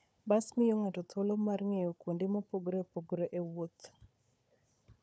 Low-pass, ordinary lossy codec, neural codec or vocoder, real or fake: none; none; codec, 16 kHz, 4 kbps, FunCodec, trained on Chinese and English, 50 frames a second; fake